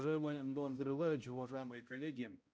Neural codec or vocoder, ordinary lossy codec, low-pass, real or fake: codec, 16 kHz, 0.5 kbps, X-Codec, HuBERT features, trained on balanced general audio; none; none; fake